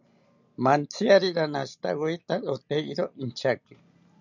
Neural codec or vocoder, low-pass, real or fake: none; 7.2 kHz; real